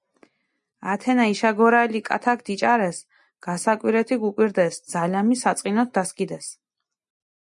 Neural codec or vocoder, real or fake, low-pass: none; real; 10.8 kHz